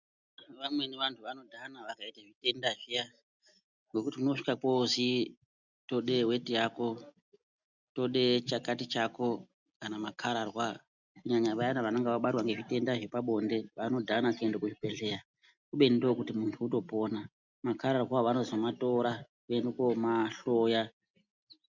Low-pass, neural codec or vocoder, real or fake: 7.2 kHz; none; real